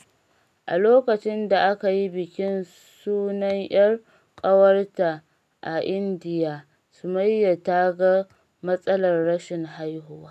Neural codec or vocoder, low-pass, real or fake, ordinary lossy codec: none; 14.4 kHz; real; none